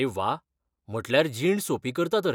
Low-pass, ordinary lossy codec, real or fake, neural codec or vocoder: 19.8 kHz; none; real; none